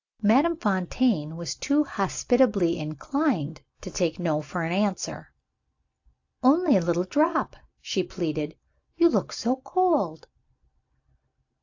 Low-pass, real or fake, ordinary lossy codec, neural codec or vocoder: 7.2 kHz; real; AAC, 48 kbps; none